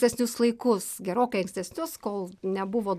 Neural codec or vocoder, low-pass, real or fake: none; 14.4 kHz; real